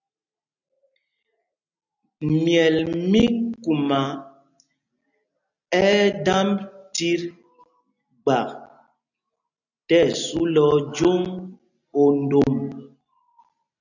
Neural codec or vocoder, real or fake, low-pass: none; real; 7.2 kHz